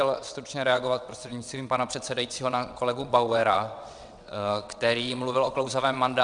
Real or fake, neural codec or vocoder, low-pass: fake; vocoder, 22.05 kHz, 80 mel bands, WaveNeXt; 9.9 kHz